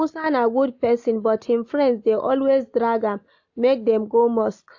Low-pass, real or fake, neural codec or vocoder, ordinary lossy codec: 7.2 kHz; real; none; AAC, 48 kbps